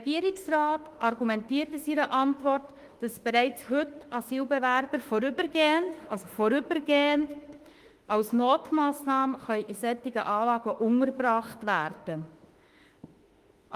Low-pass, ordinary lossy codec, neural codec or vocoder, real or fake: 14.4 kHz; Opus, 24 kbps; autoencoder, 48 kHz, 32 numbers a frame, DAC-VAE, trained on Japanese speech; fake